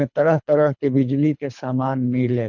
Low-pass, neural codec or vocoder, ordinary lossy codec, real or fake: 7.2 kHz; codec, 24 kHz, 3 kbps, HILCodec; none; fake